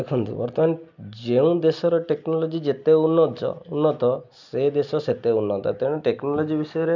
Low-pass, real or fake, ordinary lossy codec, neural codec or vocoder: 7.2 kHz; real; none; none